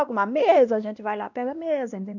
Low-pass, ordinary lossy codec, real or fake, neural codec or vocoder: 7.2 kHz; none; fake; codec, 16 kHz, 1 kbps, X-Codec, WavLM features, trained on Multilingual LibriSpeech